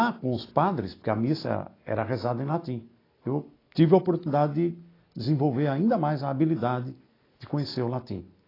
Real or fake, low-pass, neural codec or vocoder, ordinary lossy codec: real; 5.4 kHz; none; AAC, 24 kbps